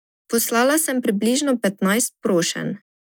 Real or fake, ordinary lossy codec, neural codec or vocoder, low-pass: real; none; none; none